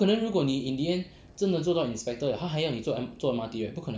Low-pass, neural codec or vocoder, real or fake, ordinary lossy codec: none; none; real; none